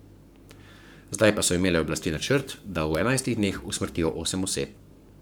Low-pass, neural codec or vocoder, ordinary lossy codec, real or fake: none; codec, 44.1 kHz, 7.8 kbps, Pupu-Codec; none; fake